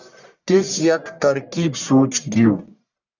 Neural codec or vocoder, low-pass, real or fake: codec, 44.1 kHz, 1.7 kbps, Pupu-Codec; 7.2 kHz; fake